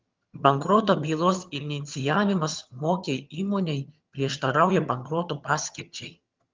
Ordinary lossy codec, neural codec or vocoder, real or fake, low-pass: Opus, 32 kbps; vocoder, 22.05 kHz, 80 mel bands, HiFi-GAN; fake; 7.2 kHz